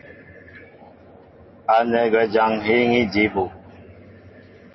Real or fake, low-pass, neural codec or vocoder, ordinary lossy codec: real; 7.2 kHz; none; MP3, 24 kbps